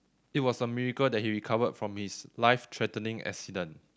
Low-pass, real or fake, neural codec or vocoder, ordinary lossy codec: none; real; none; none